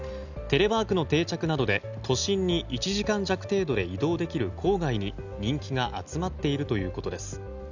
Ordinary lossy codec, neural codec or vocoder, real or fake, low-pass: none; none; real; 7.2 kHz